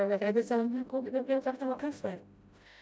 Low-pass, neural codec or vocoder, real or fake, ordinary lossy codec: none; codec, 16 kHz, 0.5 kbps, FreqCodec, smaller model; fake; none